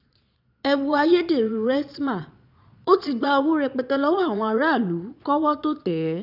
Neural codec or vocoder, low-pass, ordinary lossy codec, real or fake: vocoder, 22.05 kHz, 80 mel bands, Vocos; 5.4 kHz; none; fake